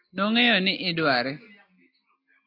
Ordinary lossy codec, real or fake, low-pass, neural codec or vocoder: AAC, 32 kbps; real; 5.4 kHz; none